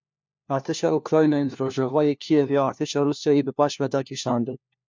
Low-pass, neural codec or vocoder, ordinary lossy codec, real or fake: 7.2 kHz; codec, 16 kHz, 1 kbps, FunCodec, trained on LibriTTS, 50 frames a second; MP3, 64 kbps; fake